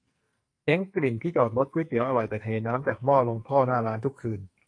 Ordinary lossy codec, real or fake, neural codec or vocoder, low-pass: AAC, 48 kbps; fake; codec, 44.1 kHz, 2.6 kbps, SNAC; 9.9 kHz